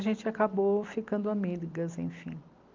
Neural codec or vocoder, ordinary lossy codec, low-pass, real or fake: none; Opus, 32 kbps; 7.2 kHz; real